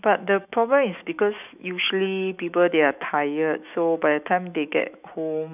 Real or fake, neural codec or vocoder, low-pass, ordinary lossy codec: fake; autoencoder, 48 kHz, 128 numbers a frame, DAC-VAE, trained on Japanese speech; 3.6 kHz; none